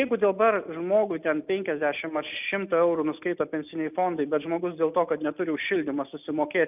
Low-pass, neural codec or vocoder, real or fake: 3.6 kHz; none; real